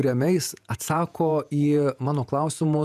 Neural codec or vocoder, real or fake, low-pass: vocoder, 48 kHz, 128 mel bands, Vocos; fake; 14.4 kHz